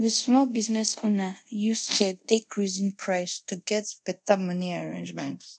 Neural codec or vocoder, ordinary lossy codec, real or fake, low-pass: codec, 24 kHz, 0.5 kbps, DualCodec; MP3, 96 kbps; fake; 9.9 kHz